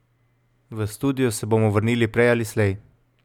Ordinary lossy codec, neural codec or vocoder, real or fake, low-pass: none; none; real; 19.8 kHz